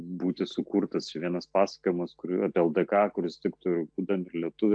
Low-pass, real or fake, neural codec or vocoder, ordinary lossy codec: 9.9 kHz; real; none; AAC, 64 kbps